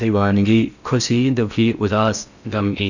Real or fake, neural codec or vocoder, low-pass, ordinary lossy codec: fake; codec, 16 kHz in and 24 kHz out, 0.6 kbps, FocalCodec, streaming, 4096 codes; 7.2 kHz; none